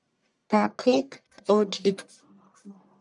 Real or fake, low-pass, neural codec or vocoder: fake; 10.8 kHz; codec, 44.1 kHz, 1.7 kbps, Pupu-Codec